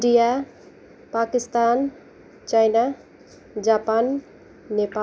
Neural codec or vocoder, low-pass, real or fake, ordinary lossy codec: none; none; real; none